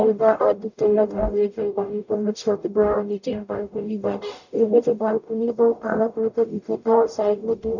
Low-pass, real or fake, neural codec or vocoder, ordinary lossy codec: 7.2 kHz; fake; codec, 44.1 kHz, 0.9 kbps, DAC; none